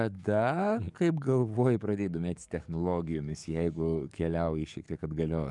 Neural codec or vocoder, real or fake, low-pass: codec, 44.1 kHz, 7.8 kbps, DAC; fake; 10.8 kHz